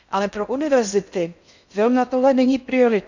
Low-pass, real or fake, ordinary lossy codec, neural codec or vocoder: 7.2 kHz; fake; none; codec, 16 kHz in and 24 kHz out, 0.6 kbps, FocalCodec, streaming, 2048 codes